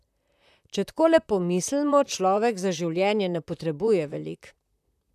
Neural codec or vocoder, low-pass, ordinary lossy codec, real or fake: vocoder, 44.1 kHz, 128 mel bands, Pupu-Vocoder; 14.4 kHz; none; fake